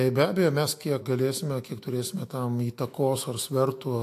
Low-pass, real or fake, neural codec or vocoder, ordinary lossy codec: 14.4 kHz; fake; autoencoder, 48 kHz, 128 numbers a frame, DAC-VAE, trained on Japanese speech; AAC, 64 kbps